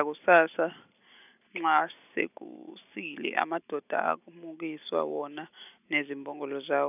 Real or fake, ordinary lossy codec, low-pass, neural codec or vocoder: real; none; 3.6 kHz; none